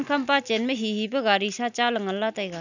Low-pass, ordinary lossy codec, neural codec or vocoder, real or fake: 7.2 kHz; none; none; real